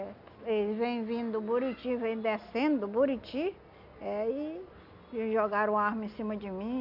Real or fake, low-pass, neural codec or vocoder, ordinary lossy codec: real; 5.4 kHz; none; none